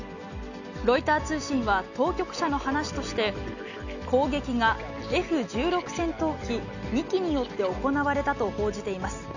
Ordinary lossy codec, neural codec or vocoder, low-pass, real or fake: none; none; 7.2 kHz; real